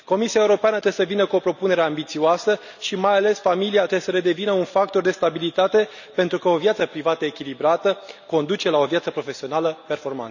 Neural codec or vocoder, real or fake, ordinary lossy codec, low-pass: none; real; none; 7.2 kHz